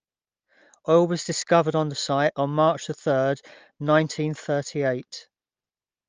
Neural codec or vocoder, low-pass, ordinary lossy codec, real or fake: none; 7.2 kHz; Opus, 24 kbps; real